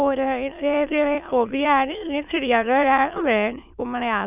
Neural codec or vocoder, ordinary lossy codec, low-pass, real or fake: autoencoder, 22.05 kHz, a latent of 192 numbers a frame, VITS, trained on many speakers; none; 3.6 kHz; fake